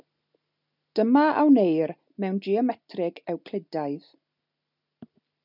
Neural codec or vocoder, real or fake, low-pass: none; real; 5.4 kHz